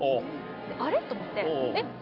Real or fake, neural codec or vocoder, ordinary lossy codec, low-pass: real; none; none; 5.4 kHz